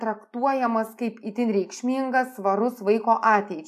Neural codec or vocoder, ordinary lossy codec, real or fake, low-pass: none; AAC, 96 kbps; real; 14.4 kHz